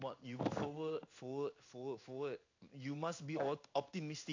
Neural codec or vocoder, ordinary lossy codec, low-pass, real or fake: codec, 16 kHz in and 24 kHz out, 1 kbps, XY-Tokenizer; AAC, 48 kbps; 7.2 kHz; fake